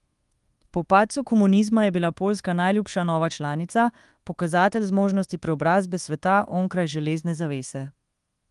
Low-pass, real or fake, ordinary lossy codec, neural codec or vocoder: 10.8 kHz; fake; Opus, 24 kbps; codec, 24 kHz, 1.2 kbps, DualCodec